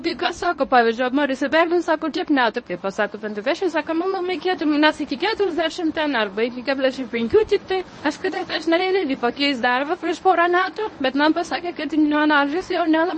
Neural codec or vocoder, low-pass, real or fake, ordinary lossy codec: codec, 24 kHz, 0.9 kbps, WavTokenizer, medium speech release version 1; 10.8 kHz; fake; MP3, 32 kbps